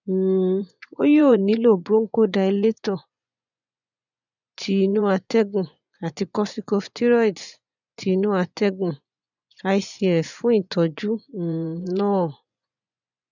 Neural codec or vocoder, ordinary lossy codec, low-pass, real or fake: vocoder, 24 kHz, 100 mel bands, Vocos; none; 7.2 kHz; fake